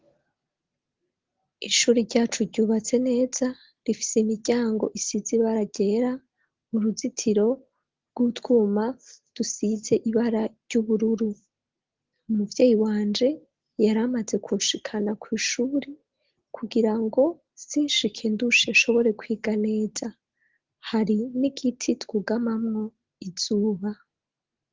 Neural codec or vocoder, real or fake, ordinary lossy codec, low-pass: none; real; Opus, 16 kbps; 7.2 kHz